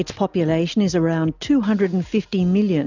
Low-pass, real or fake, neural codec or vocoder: 7.2 kHz; real; none